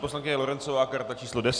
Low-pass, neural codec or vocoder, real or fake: 9.9 kHz; none; real